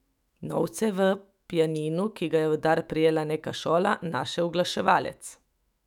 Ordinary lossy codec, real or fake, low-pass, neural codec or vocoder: none; fake; 19.8 kHz; autoencoder, 48 kHz, 128 numbers a frame, DAC-VAE, trained on Japanese speech